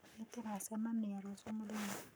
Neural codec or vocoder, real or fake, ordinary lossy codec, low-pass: codec, 44.1 kHz, 7.8 kbps, Pupu-Codec; fake; none; none